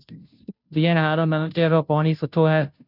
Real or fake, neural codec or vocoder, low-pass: fake; codec, 16 kHz, 0.5 kbps, FunCodec, trained on Chinese and English, 25 frames a second; 5.4 kHz